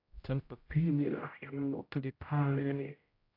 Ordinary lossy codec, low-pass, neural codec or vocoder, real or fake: AAC, 32 kbps; 5.4 kHz; codec, 16 kHz, 0.5 kbps, X-Codec, HuBERT features, trained on general audio; fake